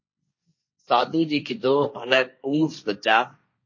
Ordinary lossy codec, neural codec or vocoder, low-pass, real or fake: MP3, 32 kbps; codec, 16 kHz, 1.1 kbps, Voila-Tokenizer; 7.2 kHz; fake